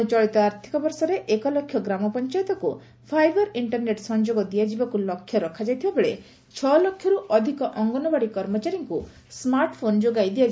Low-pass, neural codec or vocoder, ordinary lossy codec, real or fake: none; none; none; real